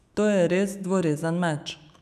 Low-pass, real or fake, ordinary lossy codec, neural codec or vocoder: 14.4 kHz; fake; none; autoencoder, 48 kHz, 128 numbers a frame, DAC-VAE, trained on Japanese speech